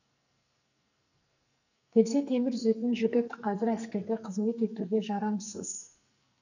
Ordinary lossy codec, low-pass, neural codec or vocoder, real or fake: none; 7.2 kHz; codec, 44.1 kHz, 2.6 kbps, SNAC; fake